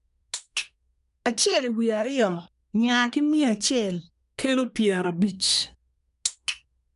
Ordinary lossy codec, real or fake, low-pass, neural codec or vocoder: none; fake; 10.8 kHz; codec, 24 kHz, 1 kbps, SNAC